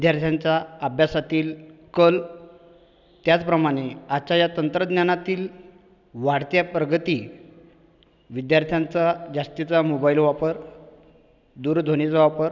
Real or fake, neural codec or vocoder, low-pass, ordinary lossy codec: real; none; 7.2 kHz; none